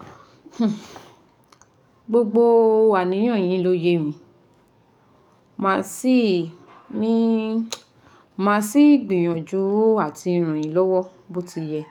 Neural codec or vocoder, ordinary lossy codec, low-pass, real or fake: codec, 44.1 kHz, 7.8 kbps, DAC; none; 19.8 kHz; fake